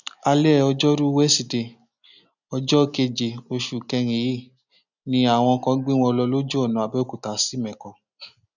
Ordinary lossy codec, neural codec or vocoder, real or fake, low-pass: none; none; real; 7.2 kHz